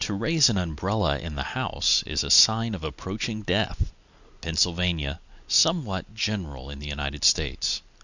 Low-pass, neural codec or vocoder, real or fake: 7.2 kHz; none; real